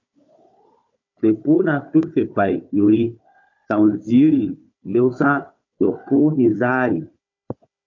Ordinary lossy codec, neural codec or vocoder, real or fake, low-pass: MP3, 48 kbps; codec, 16 kHz, 4 kbps, FunCodec, trained on Chinese and English, 50 frames a second; fake; 7.2 kHz